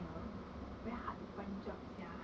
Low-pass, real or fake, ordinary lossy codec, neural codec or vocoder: none; real; none; none